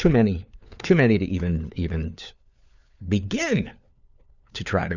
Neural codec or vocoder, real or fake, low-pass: codec, 16 kHz, 4 kbps, FunCodec, trained on LibriTTS, 50 frames a second; fake; 7.2 kHz